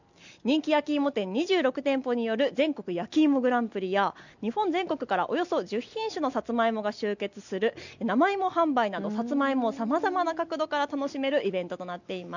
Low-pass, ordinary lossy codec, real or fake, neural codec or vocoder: 7.2 kHz; none; real; none